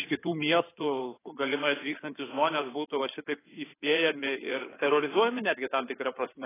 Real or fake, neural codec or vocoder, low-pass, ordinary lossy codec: fake; codec, 16 kHz in and 24 kHz out, 2.2 kbps, FireRedTTS-2 codec; 3.6 kHz; AAC, 16 kbps